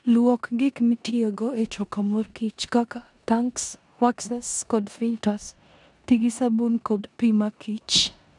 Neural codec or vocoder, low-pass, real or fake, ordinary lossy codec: codec, 16 kHz in and 24 kHz out, 0.9 kbps, LongCat-Audio-Codec, four codebook decoder; 10.8 kHz; fake; none